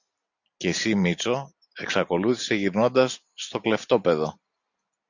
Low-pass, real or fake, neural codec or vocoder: 7.2 kHz; real; none